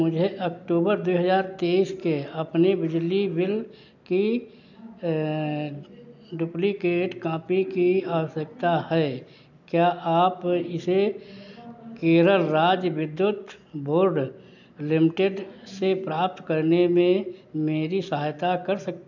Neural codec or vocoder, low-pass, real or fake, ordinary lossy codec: none; 7.2 kHz; real; none